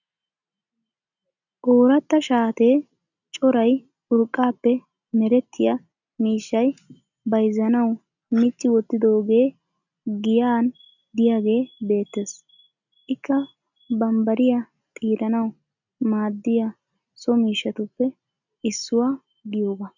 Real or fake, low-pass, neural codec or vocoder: real; 7.2 kHz; none